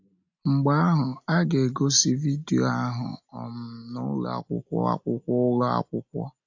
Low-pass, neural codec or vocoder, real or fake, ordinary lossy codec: 7.2 kHz; none; real; AAC, 48 kbps